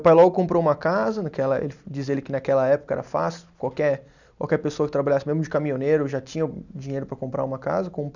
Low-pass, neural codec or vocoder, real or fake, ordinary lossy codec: 7.2 kHz; none; real; none